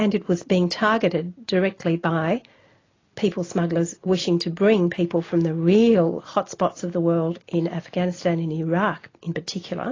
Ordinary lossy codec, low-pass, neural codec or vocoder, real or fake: AAC, 32 kbps; 7.2 kHz; none; real